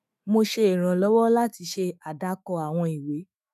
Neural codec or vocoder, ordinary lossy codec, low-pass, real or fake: autoencoder, 48 kHz, 128 numbers a frame, DAC-VAE, trained on Japanese speech; none; 14.4 kHz; fake